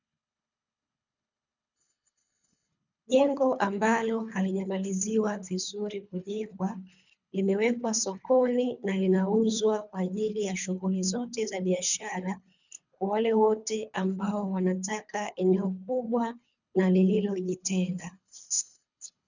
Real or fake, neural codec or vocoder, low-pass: fake; codec, 24 kHz, 3 kbps, HILCodec; 7.2 kHz